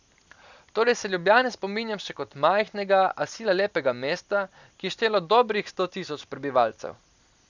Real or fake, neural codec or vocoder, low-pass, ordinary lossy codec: real; none; 7.2 kHz; none